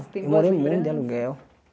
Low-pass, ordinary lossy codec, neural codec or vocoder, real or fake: none; none; none; real